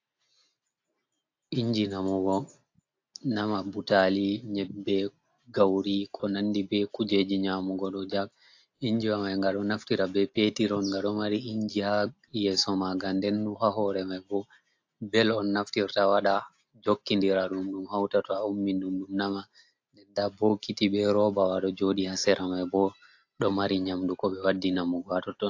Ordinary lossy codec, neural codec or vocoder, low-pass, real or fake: AAC, 48 kbps; none; 7.2 kHz; real